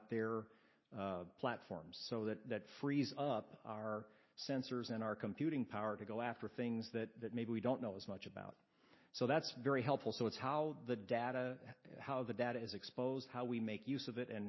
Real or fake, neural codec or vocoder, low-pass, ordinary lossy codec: real; none; 7.2 kHz; MP3, 24 kbps